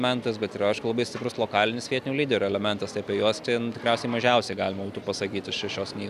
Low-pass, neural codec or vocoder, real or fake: 14.4 kHz; none; real